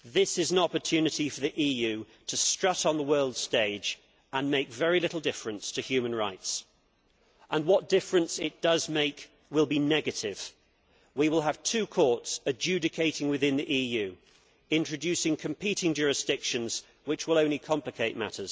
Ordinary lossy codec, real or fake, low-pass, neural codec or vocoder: none; real; none; none